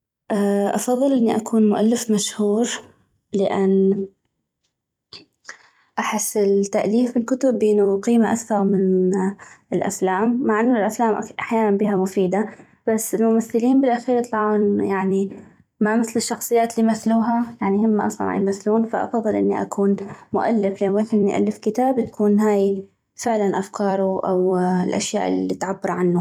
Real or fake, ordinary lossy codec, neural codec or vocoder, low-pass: fake; none; vocoder, 44.1 kHz, 128 mel bands every 256 samples, BigVGAN v2; 19.8 kHz